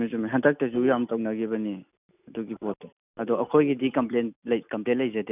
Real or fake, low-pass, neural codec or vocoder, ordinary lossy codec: real; 3.6 kHz; none; none